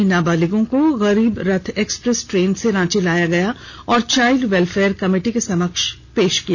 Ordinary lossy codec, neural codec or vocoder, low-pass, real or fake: none; none; 7.2 kHz; real